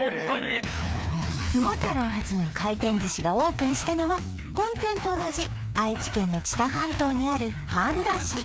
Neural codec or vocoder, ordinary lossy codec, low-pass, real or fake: codec, 16 kHz, 2 kbps, FreqCodec, larger model; none; none; fake